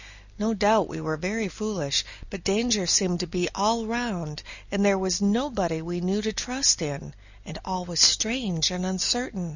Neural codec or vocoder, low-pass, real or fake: none; 7.2 kHz; real